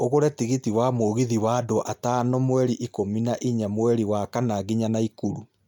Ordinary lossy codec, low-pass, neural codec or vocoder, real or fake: none; 19.8 kHz; none; real